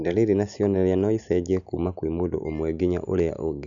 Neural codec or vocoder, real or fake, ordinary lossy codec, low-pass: none; real; none; 7.2 kHz